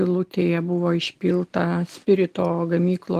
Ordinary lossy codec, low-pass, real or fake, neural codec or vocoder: Opus, 24 kbps; 14.4 kHz; real; none